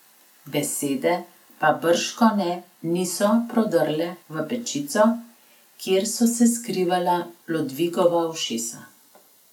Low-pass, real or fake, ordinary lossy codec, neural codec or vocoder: 19.8 kHz; real; none; none